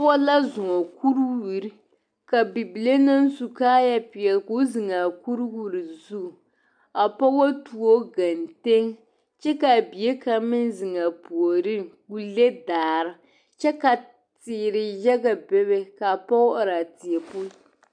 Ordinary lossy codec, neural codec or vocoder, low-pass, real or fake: MP3, 96 kbps; none; 9.9 kHz; real